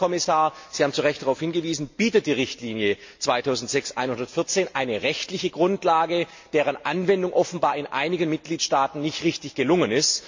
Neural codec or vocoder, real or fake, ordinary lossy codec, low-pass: none; real; MP3, 48 kbps; 7.2 kHz